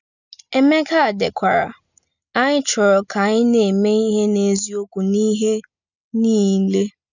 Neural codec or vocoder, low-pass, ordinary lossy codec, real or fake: none; 7.2 kHz; none; real